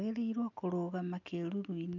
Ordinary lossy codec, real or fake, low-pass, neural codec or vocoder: none; real; 7.2 kHz; none